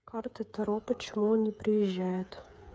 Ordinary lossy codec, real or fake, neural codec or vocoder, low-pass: none; fake; codec, 16 kHz, 8 kbps, FreqCodec, smaller model; none